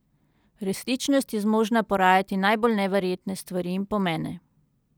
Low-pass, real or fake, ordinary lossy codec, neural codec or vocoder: none; real; none; none